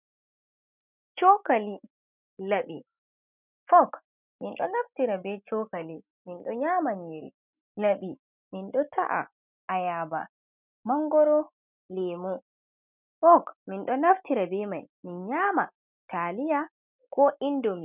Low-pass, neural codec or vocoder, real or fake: 3.6 kHz; none; real